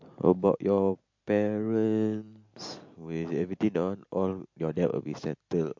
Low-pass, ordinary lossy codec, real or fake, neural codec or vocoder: 7.2 kHz; MP3, 48 kbps; real; none